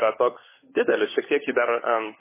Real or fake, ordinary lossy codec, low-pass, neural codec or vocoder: fake; MP3, 16 kbps; 3.6 kHz; codec, 16 kHz, 16 kbps, FunCodec, trained on LibriTTS, 50 frames a second